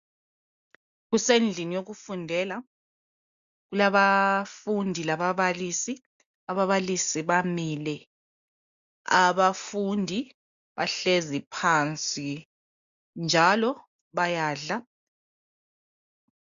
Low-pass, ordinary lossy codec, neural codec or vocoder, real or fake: 7.2 kHz; AAC, 96 kbps; none; real